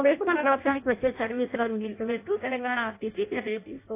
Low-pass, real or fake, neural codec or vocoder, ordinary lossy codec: 3.6 kHz; fake; codec, 16 kHz in and 24 kHz out, 0.6 kbps, FireRedTTS-2 codec; AAC, 24 kbps